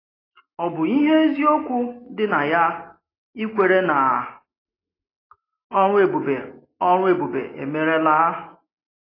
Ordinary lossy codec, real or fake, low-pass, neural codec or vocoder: AAC, 24 kbps; real; 5.4 kHz; none